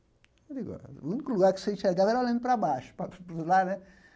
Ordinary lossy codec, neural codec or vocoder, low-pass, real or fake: none; none; none; real